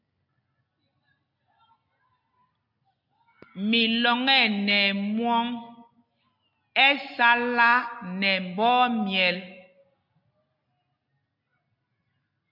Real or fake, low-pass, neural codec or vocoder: real; 5.4 kHz; none